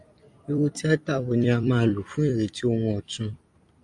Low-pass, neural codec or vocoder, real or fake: 10.8 kHz; vocoder, 44.1 kHz, 128 mel bands every 256 samples, BigVGAN v2; fake